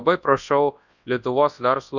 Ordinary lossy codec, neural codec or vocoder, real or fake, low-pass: Opus, 64 kbps; codec, 24 kHz, 0.9 kbps, WavTokenizer, large speech release; fake; 7.2 kHz